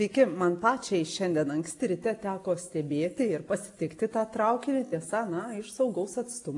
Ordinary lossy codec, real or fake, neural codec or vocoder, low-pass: AAC, 48 kbps; real; none; 10.8 kHz